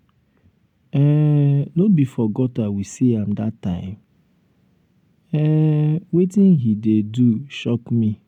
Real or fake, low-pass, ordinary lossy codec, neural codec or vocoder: real; 19.8 kHz; none; none